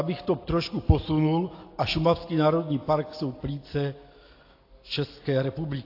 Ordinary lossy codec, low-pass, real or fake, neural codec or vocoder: AAC, 32 kbps; 5.4 kHz; real; none